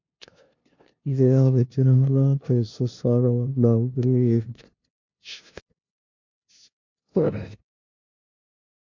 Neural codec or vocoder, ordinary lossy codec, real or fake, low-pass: codec, 16 kHz, 0.5 kbps, FunCodec, trained on LibriTTS, 25 frames a second; MP3, 48 kbps; fake; 7.2 kHz